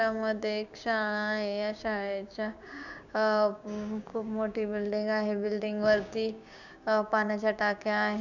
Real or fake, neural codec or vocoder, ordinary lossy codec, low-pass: fake; codec, 16 kHz, 6 kbps, DAC; none; 7.2 kHz